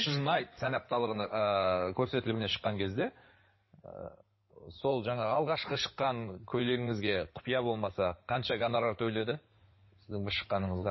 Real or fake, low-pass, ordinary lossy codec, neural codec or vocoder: fake; 7.2 kHz; MP3, 24 kbps; codec, 16 kHz in and 24 kHz out, 2.2 kbps, FireRedTTS-2 codec